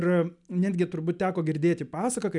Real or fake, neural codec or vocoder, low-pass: real; none; 10.8 kHz